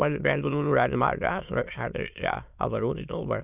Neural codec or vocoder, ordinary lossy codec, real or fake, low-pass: autoencoder, 22.05 kHz, a latent of 192 numbers a frame, VITS, trained on many speakers; none; fake; 3.6 kHz